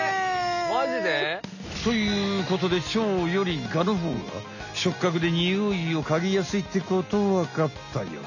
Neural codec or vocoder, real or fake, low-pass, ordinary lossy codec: none; real; 7.2 kHz; none